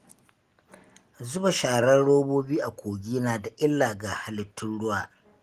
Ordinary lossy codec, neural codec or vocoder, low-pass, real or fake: Opus, 24 kbps; none; 14.4 kHz; real